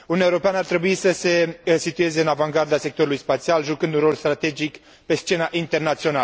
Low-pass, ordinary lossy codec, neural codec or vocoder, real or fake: none; none; none; real